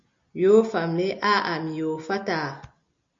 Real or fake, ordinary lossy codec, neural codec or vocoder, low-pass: real; MP3, 48 kbps; none; 7.2 kHz